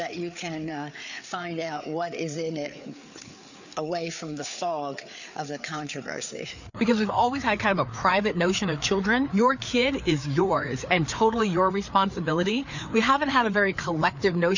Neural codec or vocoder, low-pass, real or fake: codec, 16 kHz, 4 kbps, FreqCodec, larger model; 7.2 kHz; fake